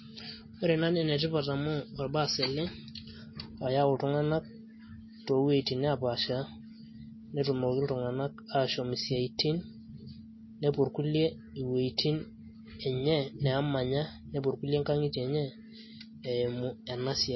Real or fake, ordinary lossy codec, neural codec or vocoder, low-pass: real; MP3, 24 kbps; none; 7.2 kHz